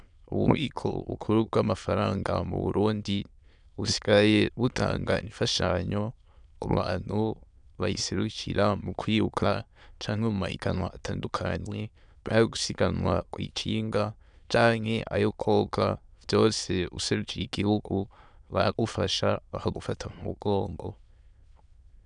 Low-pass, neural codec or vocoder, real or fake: 9.9 kHz; autoencoder, 22.05 kHz, a latent of 192 numbers a frame, VITS, trained on many speakers; fake